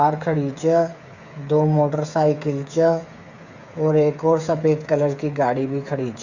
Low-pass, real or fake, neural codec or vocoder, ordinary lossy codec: 7.2 kHz; fake; codec, 16 kHz, 8 kbps, FreqCodec, smaller model; Opus, 64 kbps